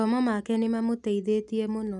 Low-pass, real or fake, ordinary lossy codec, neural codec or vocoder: 10.8 kHz; real; none; none